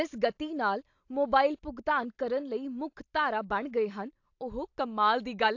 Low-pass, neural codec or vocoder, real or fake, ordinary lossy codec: 7.2 kHz; none; real; AAC, 48 kbps